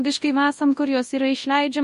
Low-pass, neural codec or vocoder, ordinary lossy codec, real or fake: 10.8 kHz; codec, 24 kHz, 0.9 kbps, WavTokenizer, large speech release; MP3, 48 kbps; fake